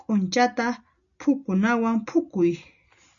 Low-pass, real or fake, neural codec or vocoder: 7.2 kHz; real; none